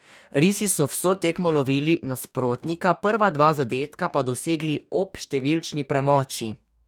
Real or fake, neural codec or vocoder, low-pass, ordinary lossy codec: fake; codec, 44.1 kHz, 2.6 kbps, DAC; 19.8 kHz; none